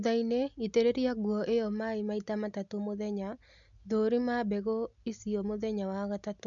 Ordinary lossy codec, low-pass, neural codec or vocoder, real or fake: none; 7.2 kHz; none; real